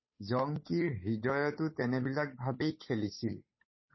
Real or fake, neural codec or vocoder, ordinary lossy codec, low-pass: fake; codec, 16 kHz, 8 kbps, FunCodec, trained on Chinese and English, 25 frames a second; MP3, 24 kbps; 7.2 kHz